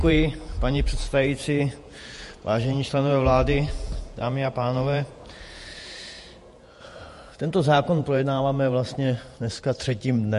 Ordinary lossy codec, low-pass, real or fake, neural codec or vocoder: MP3, 48 kbps; 14.4 kHz; fake; vocoder, 48 kHz, 128 mel bands, Vocos